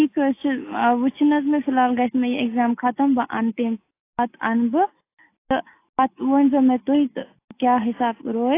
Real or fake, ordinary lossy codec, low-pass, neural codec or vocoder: real; AAC, 24 kbps; 3.6 kHz; none